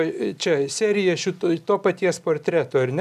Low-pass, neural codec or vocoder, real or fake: 14.4 kHz; none; real